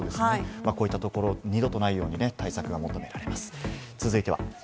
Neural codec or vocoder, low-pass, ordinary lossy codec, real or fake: none; none; none; real